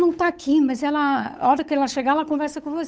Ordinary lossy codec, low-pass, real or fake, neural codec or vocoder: none; none; fake; codec, 16 kHz, 8 kbps, FunCodec, trained on Chinese and English, 25 frames a second